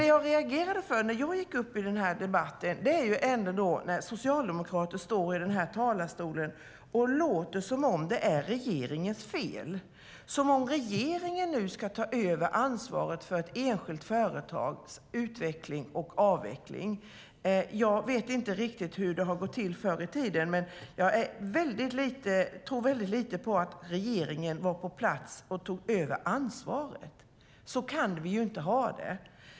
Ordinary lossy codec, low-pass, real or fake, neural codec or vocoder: none; none; real; none